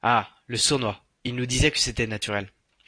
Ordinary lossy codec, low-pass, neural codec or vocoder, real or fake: AAC, 48 kbps; 9.9 kHz; none; real